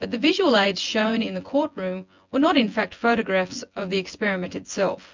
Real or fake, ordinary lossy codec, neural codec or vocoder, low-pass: fake; MP3, 64 kbps; vocoder, 24 kHz, 100 mel bands, Vocos; 7.2 kHz